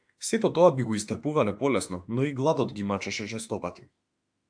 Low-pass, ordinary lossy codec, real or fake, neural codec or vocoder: 9.9 kHz; AAC, 64 kbps; fake; autoencoder, 48 kHz, 32 numbers a frame, DAC-VAE, trained on Japanese speech